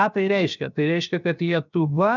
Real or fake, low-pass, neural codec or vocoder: fake; 7.2 kHz; codec, 16 kHz, 0.7 kbps, FocalCodec